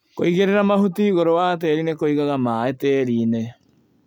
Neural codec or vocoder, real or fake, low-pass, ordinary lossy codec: none; real; 19.8 kHz; none